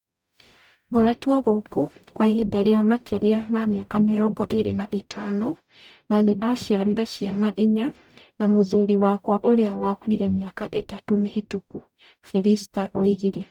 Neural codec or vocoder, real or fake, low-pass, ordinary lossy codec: codec, 44.1 kHz, 0.9 kbps, DAC; fake; 19.8 kHz; none